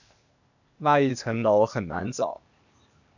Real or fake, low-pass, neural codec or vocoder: fake; 7.2 kHz; codec, 16 kHz, 0.8 kbps, ZipCodec